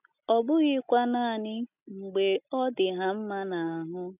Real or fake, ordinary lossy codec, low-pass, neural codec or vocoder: real; none; 3.6 kHz; none